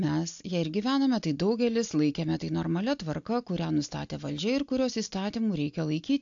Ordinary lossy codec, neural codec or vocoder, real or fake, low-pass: AAC, 64 kbps; none; real; 7.2 kHz